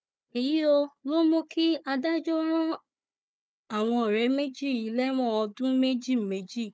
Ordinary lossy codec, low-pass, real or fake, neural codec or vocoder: none; none; fake; codec, 16 kHz, 4 kbps, FunCodec, trained on Chinese and English, 50 frames a second